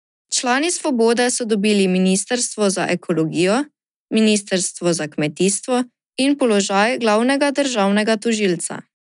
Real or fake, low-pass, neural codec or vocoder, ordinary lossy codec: real; 10.8 kHz; none; none